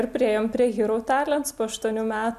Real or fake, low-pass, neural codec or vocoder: real; 14.4 kHz; none